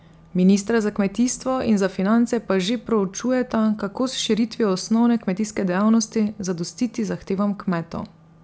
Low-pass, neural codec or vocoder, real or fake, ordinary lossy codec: none; none; real; none